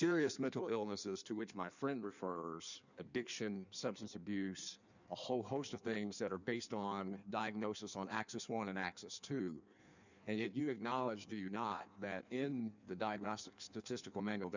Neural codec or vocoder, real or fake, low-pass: codec, 16 kHz in and 24 kHz out, 1.1 kbps, FireRedTTS-2 codec; fake; 7.2 kHz